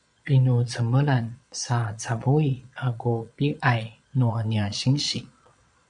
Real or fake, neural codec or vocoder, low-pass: fake; vocoder, 22.05 kHz, 80 mel bands, Vocos; 9.9 kHz